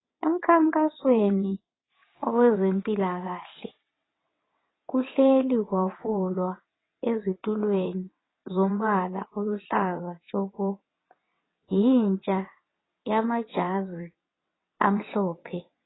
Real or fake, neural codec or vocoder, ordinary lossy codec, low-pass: fake; vocoder, 22.05 kHz, 80 mel bands, WaveNeXt; AAC, 16 kbps; 7.2 kHz